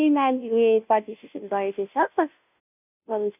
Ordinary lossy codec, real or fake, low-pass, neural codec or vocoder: none; fake; 3.6 kHz; codec, 16 kHz, 0.5 kbps, FunCodec, trained on Chinese and English, 25 frames a second